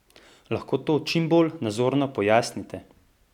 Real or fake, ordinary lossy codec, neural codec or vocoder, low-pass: real; none; none; 19.8 kHz